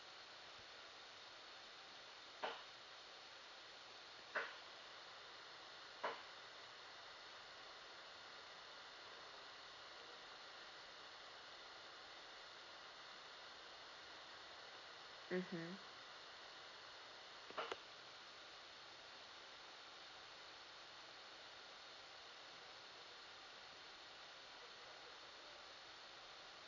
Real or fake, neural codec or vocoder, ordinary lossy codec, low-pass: real; none; none; 7.2 kHz